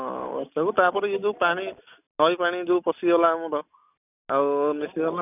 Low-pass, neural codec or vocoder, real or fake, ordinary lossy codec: 3.6 kHz; none; real; none